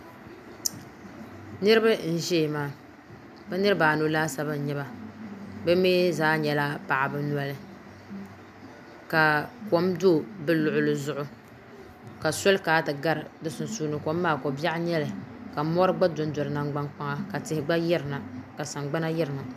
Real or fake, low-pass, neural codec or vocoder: real; 14.4 kHz; none